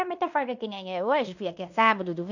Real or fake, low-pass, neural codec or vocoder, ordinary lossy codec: fake; 7.2 kHz; codec, 16 kHz in and 24 kHz out, 0.9 kbps, LongCat-Audio-Codec, fine tuned four codebook decoder; none